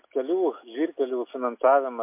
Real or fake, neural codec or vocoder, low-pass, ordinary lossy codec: real; none; 3.6 kHz; MP3, 24 kbps